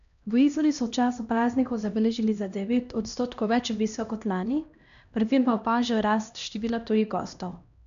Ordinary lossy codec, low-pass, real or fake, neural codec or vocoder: none; 7.2 kHz; fake; codec, 16 kHz, 1 kbps, X-Codec, HuBERT features, trained on LibriSpeech